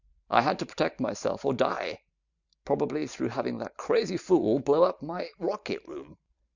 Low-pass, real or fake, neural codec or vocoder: 7.2 kHz; fake; vocoder, 44.1 kHz, 128 mel bands every 512 samples, BigVGAN v2